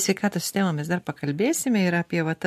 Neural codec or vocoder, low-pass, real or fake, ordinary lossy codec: none; 14.4 kHz; real; MP3, 64 kbps